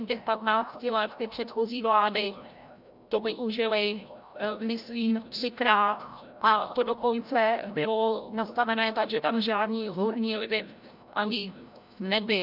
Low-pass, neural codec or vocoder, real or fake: 5.4 kHz; codec, 16 kHz, 0.5 kbps, FreqCodec, larger model; fake